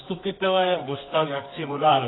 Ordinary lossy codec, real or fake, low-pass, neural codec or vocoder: AAC, 16 kbps; fake; 7.2 kHz; codec, 24 kHz, 0.9 kbps, WavTokenizer, medium music audio release